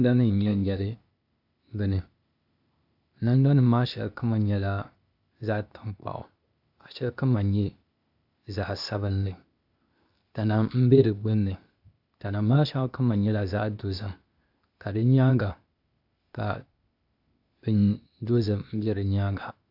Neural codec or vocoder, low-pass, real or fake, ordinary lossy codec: codec, 16 kHz, 0.8 kbps, ZipCodec; 5.4 kHz; fake; AAC, 48 kbps